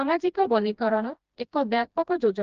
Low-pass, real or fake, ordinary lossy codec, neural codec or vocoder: 7.2 kHz; fake; Opus, 24 kbps; codec, 16 kHz, 1 kbps, FreqCodec, smaller model